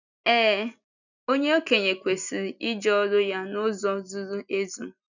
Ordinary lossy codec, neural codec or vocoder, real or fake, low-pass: none; none; real; 7.2 kHz